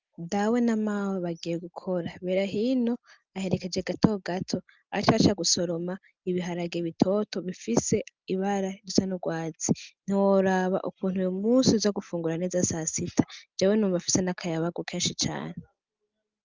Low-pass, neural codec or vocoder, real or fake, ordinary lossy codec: 7.2 kHz; none; real; Opus, 24 kbps